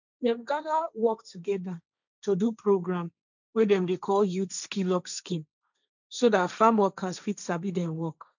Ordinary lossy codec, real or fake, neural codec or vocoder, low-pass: none; fake; codec, 16 kHz, 1.1 kbps, Voila-Tokenizer; 7.2 kHz